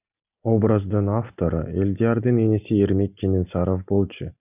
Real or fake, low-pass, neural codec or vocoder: real; 3.6 kHz; none